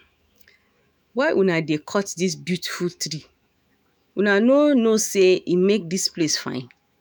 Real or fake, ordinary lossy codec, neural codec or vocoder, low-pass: fake; none; autoencoder, 48 kHz, 128 numbers a frame, DAC-VAE, trained on Japanese speech; none